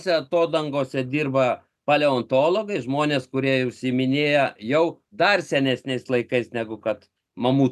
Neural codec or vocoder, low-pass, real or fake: none; 14.4 kHz; real